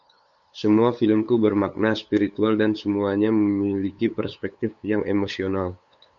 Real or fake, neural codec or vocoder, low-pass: fake; codec, 16 kHz, 8 kbps, FunCodec, trained on LibriTTS, 25 frames a second; 7.2 kHz